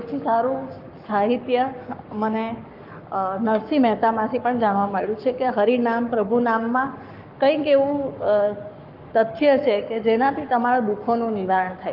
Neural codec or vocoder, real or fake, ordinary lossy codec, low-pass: codec, 44.1 kHz, 7.8 kbps, Pupu-Codec; fake; Opus, 32 kbps; 5.4 kHz